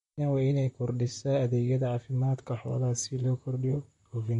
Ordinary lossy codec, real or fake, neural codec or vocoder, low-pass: MP3, 48 kbps; fake; vocoder, 44.1 kHz, 128 mel bands, Pupu-Vocoder; 19.8 kHz